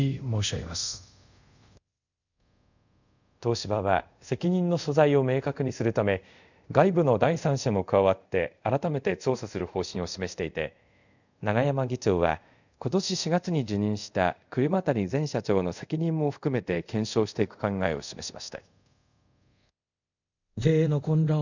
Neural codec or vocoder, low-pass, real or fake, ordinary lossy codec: codec, 24 kHz, 0.5 kbps, DualCodec; 7.2 kHz; fake; none